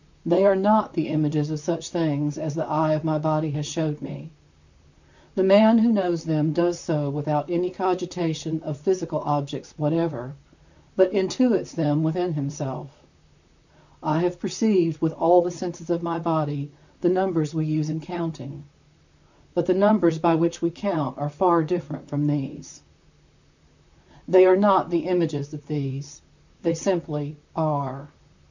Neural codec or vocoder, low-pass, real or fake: vocoder, 44.1 kHz, 128 mel bands, Pupu-Vocoder; 7.2 kHz; fake